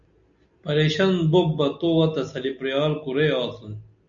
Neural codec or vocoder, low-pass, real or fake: none; 7.2 kHz; real